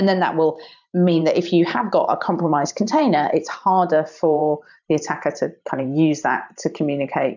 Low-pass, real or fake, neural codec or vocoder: 7.2 kHz; fake; vocoder, 44.1 kHz, 128 mel bands every 512 samples, BigVGAN v2